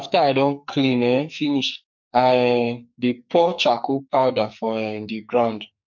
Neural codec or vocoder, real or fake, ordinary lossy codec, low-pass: codec, 44.1 kHz, 2.6 kbps, SNAC; fake; MP3, 48 kbps; 7.2 kHz